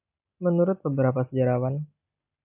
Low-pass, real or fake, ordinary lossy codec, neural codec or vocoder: 3.6 kHz; real; AAC, 32 kbps; none